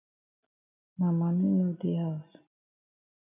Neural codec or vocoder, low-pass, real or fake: none; 3.6 kHz; real